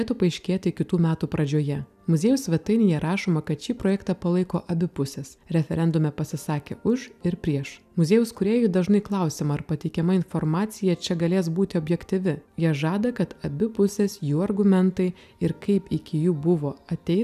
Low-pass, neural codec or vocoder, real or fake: 14.4 kHz; none; real